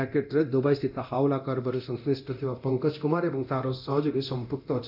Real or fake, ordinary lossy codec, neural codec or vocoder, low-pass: fake; none; codec, 24 kHz, 0.9 kbps, DualCodec; 5.4 kHz